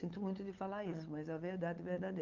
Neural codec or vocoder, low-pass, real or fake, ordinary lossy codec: codec, 16 kHz, 8 kbps, FunCodec, trained on LibriTTS, 25 frames a second; 7.2 kHz; fake; Opus, 24 kbps